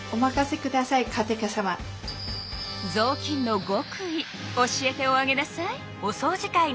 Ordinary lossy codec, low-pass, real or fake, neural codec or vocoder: none; none; real; none